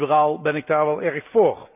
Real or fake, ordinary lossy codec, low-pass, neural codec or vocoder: real; none; 3.6 kHz; none